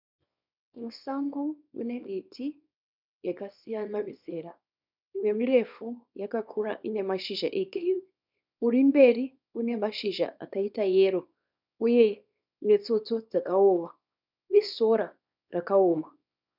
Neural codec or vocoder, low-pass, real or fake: codec, 24 kHz, 0.9 kbps, WavTokenizer, small release; 5.4 kHz; fake